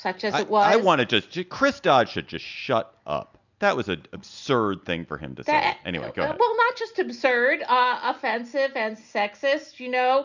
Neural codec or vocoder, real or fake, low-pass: none; real; 7.2 kHz